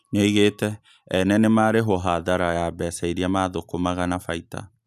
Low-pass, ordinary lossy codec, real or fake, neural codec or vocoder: 14.4 kHz; none; real; none